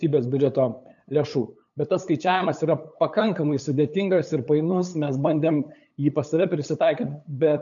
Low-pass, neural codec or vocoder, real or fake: 7.2 kHz; codec, 16 kHz, 8 kbps, FunCodec, trained on LibriTTS, 25 frames a second; fake